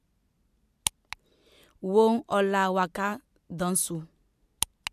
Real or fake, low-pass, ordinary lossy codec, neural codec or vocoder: real; 14.4 kHz; MP3, 96 kbps; none